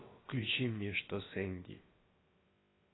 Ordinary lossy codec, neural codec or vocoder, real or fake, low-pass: AAC, 16 kbps; codec, 16 kHz, about 1 kbps, DyCAST, with the encoder's durations; fake; 7.2 kHz